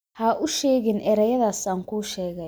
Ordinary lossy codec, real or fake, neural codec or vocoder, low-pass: none; real; none; none